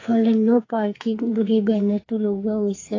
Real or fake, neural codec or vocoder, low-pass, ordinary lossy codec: fake; codec, 44.1 kHz, 2.6 kbps, SNAC; 7.2 kHz; AAC, 32 kbps